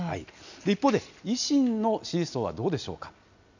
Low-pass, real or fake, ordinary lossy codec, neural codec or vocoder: 7.2 kHz; real; none; none